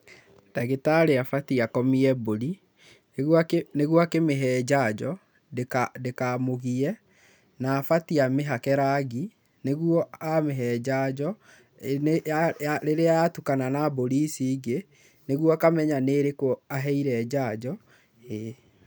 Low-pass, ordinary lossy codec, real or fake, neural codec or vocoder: none; none; real; none